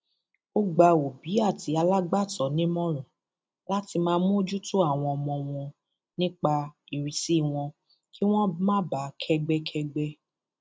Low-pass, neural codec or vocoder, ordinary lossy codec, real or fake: none; none; none; real